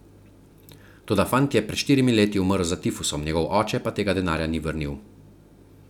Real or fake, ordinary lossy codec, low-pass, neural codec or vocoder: real; none; 19.8 kHz; none